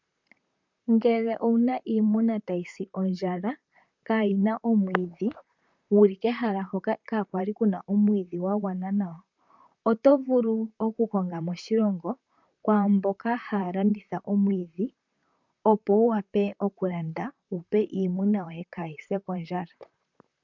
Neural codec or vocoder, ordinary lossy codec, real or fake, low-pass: vocoder, 44.1 kHz, 128 mel bands, Pupu-Vocoder; MP3, 64 kbps; fake; 7.2 kHz